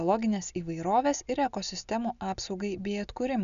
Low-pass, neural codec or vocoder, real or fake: 7.2 kHz; none; real